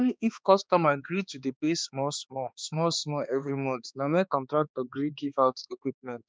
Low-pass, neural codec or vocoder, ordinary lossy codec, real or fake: none; codec, 16 kHz, 2 kbps, X-Codec, HuBERT features, trained on balanced general audio; none; fake